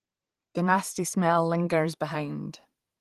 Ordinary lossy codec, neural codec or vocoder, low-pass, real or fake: Opus, 24 kbps; vocoder, 44.1 kHz, 128 mel bands every 512 samples, BigVGAN v2; 14.4 kHz; fake